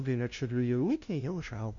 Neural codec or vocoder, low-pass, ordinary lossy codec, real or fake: codec, 16 kHz, 0.5 kbps, FunCodec, trained on LibriTTS, 25 frames a second; 7.2 kHz; MP3, 64 kbps; fake